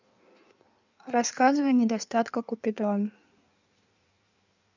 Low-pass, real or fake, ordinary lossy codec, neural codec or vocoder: 7.2 kHz; fake; none; codec, 16 kHz in and 24 kHz out, 1.1 kbps, FireRedTTS-2 codec